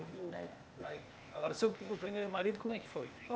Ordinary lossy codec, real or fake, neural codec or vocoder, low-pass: none; fake; codec, 16 kHz, 0.8 kbps, ZipCodec; none